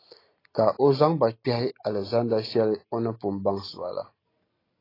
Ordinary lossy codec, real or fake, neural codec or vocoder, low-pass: AAC, 24 kbps; real; none; 5.4 kHz